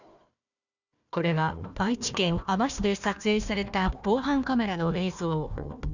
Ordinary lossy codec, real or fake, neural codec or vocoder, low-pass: none; fake; codec, 16 kHz, 1 kbps, FunCodec, trained on Chinese and English, 50 frames a second; 7.2 kHz